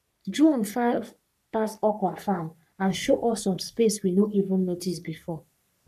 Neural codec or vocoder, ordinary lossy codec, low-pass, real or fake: codec, 44.1 kHz, 3.4 kbps, Pupu-Codec; none; 14.4 kHz; fake